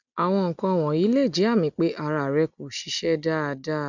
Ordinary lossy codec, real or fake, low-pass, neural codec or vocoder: none; real; 7.2 kHz; none